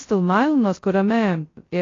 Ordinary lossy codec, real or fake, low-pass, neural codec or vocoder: AAC, 32 kbps; fake; 7.2 kHz; codec, 16 kHz, 0.2 kbps, FocalCodec